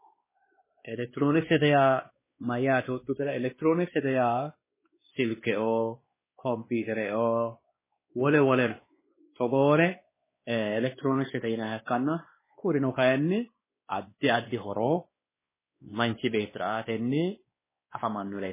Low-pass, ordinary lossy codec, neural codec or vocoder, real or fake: 3.6 kHz; MP3, 16 kbps; codec, 16 kHz, 2 kbps, X-Codec, WavLM features, trained on Multilingual LibriSpeech; fake